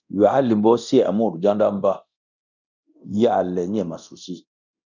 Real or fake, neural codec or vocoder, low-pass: fake; codec, 24 kHz, 0.9 kbps, DualCodec; 7.2 kHz